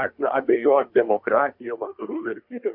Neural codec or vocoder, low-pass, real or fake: codec, 24 kHz, 1 kbps, SNAC; 5.4 kHz; fake